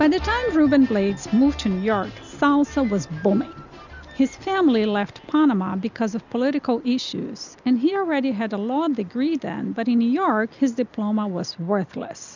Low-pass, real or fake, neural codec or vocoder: 7.2 kHz; real; none